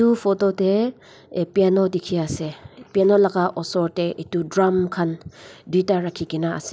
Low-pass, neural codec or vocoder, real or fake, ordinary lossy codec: none; none; real; none